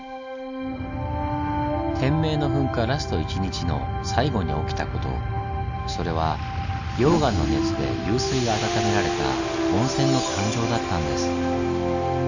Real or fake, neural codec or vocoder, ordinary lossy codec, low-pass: real; none; none; 7.2 kHz